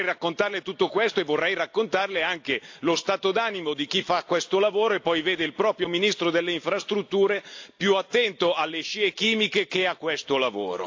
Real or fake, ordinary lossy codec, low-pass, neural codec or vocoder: real; AAC, 48 kbps; 7.2 kHz; none